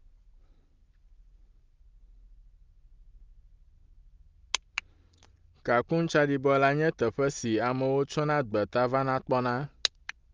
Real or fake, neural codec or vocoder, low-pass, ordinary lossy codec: real; none; 7.2 kHz; Opus, 32 kbps